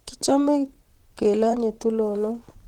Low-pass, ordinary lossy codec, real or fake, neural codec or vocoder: 19.8 kHz; Opus, 16 kbps; fake; vocoder, 44.1 kHz, 128 mel bands, Pupu-Vocoder